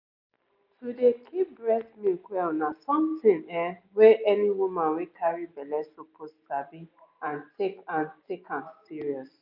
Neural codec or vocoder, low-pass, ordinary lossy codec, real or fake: none; 5.4 kHz; none; real